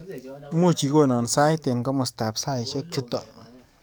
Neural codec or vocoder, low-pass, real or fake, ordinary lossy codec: codec, 44.1 kHz, 7.8 kbps, DAC; none; fake; none